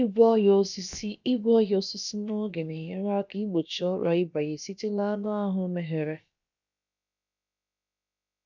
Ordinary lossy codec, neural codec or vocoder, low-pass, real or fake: none; codec, 16 kHz, about 1 kbps, DyCAST, with the encoder's durations; 7.2 kHz; fake